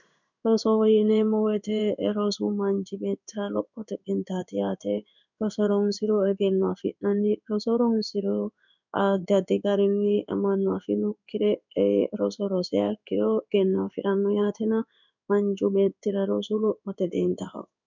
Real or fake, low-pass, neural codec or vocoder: fake; 7.2 kHz; codec, 16 kHz in and 24 kHz out, 1 kbps, XY-Tokenizer